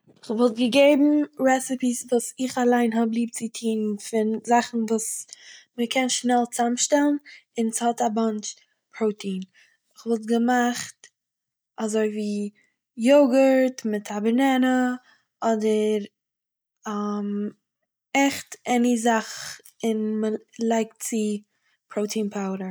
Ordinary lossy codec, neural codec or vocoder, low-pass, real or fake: none; none; none; real